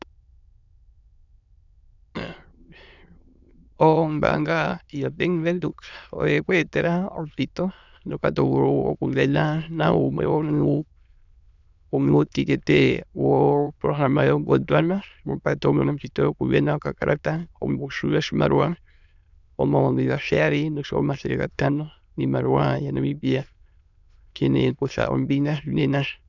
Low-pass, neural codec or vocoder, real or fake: 7.2 kHz; autoencoder, 22.05 kHz, a latent of 192 numbers a frame, VITS, trained on many speakers; fake